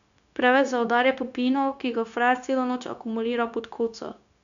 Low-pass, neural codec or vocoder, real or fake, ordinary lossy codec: 7.2 kHz; codec, 16 kHz, 0.9 kbps, LongCat-Audio-Codec; fake; none